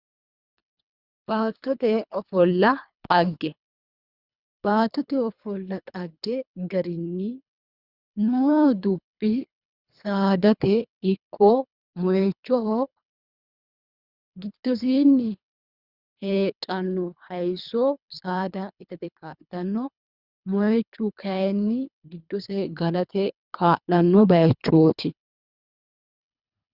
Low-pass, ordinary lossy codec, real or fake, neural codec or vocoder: 5.4 kHz; Opus, 64 kbps; fake; codec, 24 kHz, 3 kbps, HILCodec